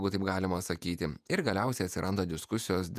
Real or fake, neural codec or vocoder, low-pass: fake; vocoder, 48 kHz, 128 mel bands, Vocos; 14.4 kHz